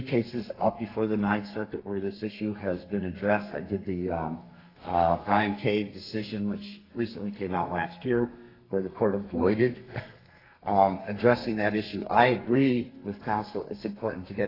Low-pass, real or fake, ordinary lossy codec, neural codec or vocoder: 5.4 kHz; fake; AAC, 24 kbps; codec, 32 kHz, 1.9 kbps, SNAC